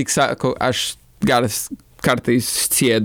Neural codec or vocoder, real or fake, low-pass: none; real; 19.8 kHz